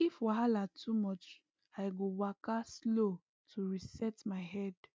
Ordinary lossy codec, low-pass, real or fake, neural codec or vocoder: none; none; real; none